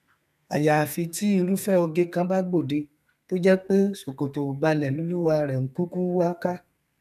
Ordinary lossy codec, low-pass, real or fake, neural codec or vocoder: none; 14.4 kHz; fake; codec, 32 kHz, 1.9 kbps, SNAC